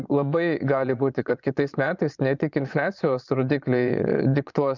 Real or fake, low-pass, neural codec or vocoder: fake; 7.2 kHz; vocoder, 22.05 kHz, 80 mel bands, Vocos